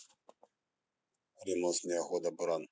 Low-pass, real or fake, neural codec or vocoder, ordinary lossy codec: none; real; none; none